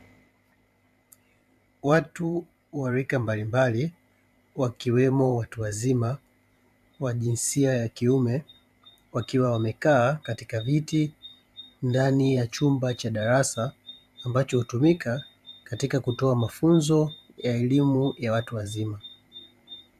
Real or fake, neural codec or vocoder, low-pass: fake; vocoder, 44.1 kHz, 128 mel bands every 512 samples, BigVGAN v2; 14.4 kHz